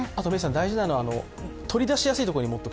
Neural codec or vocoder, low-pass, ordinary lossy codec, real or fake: none; none; none; real